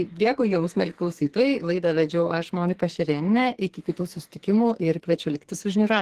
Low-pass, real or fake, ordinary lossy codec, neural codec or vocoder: 14.4 kHz; fake; Opus, 16 kbps; codec, 44.1 kHz, 2.6 kbps, SNAC